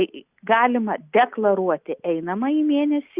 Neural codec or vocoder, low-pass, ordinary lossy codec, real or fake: none; 3.6 kHz; Opus, 64 kbps; real